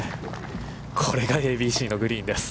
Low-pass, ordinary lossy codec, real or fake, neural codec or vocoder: none; none; real; none